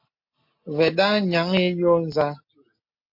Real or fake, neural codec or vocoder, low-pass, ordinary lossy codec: real; none; 5.4 kHz; MP3, 32 kbps